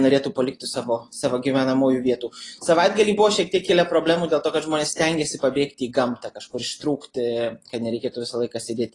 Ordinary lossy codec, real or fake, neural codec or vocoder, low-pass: AAC, 32 kbps; real; none; 10.8 kHz